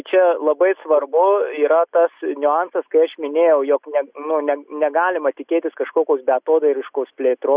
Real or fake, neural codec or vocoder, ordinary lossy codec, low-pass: real; none; Opus, 64 kbps; 3.6 kHz